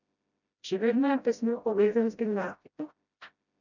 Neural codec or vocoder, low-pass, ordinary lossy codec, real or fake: codec, 16 kHz, 0.5 kbps, FreqCodec, smaller model; 7.2 kHz; none; fake